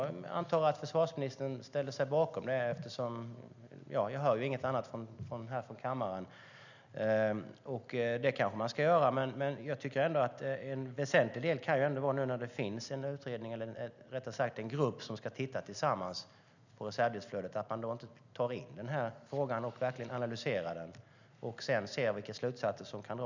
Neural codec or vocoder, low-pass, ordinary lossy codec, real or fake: none; 7.2 kHz; none; real